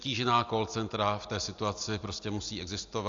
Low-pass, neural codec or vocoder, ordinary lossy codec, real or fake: 7.2 kHz; none; AAC, 64 kbps; real